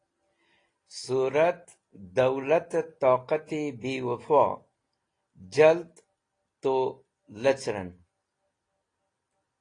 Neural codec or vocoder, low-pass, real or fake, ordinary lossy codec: none; 9.9 kHz; real; AAC, 32 kbps